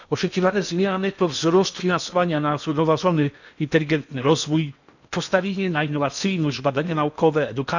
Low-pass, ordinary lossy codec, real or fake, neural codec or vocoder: 7.2 kHz; none; fake; codec, 16 kHz in and 24 kHz out, 0.8 kbps, FocalCodec, streaming, 65536 codes